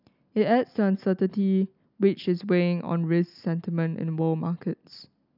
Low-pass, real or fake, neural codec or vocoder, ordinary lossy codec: 5.4 kHz; real; none; none